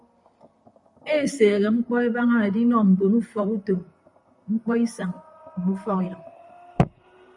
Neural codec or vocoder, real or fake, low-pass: vocoder, 44.1 kHz, 128 mel bands, Pupu-Vocoder; fake; 10.8 kHz